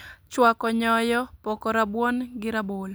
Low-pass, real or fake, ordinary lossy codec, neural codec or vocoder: none; real; none; none